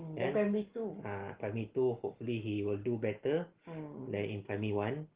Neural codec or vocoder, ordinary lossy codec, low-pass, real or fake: none; Opus, 16 kbps; 3.6 kHz; real